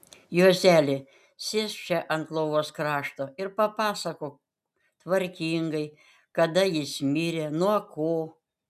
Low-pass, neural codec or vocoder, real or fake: 14.4 kHz; none; real